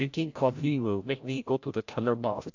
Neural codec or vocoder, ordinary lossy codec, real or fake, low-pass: codec, 16 kHz, 0.5 kbps, FreqCodec, larger model; AAC, 48 kbps; fake; 7.2 kHz